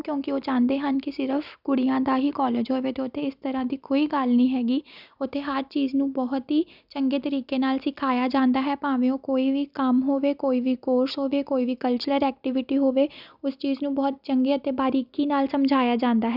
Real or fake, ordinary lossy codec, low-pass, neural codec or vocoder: real; none; 5.4 kHz; none